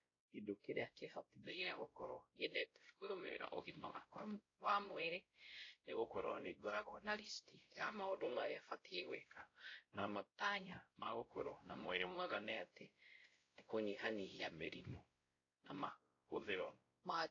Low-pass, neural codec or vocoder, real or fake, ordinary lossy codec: 7.2 kHz; codec, 16 kHz, 0.5 kbps, X-Codec, WavLM features, trained on Multilingual LibriSpeech; fake; AAC, 32 kbps